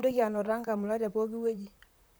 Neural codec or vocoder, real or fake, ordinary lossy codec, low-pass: vocoder, 44.1 kHz, 128 mel bands, Pupu-Vocoder; fake; none; none